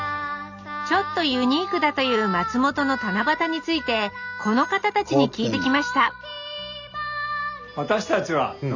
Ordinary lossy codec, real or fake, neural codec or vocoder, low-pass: none; real; none; 7.2 kHz